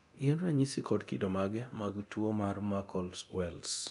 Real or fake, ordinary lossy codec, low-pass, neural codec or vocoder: fake; none; 10.8 kHz; codec, 24 kHz, 0.9 kbps, DualCodec